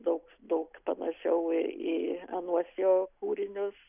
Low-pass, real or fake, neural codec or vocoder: 3.6 kHz; real; none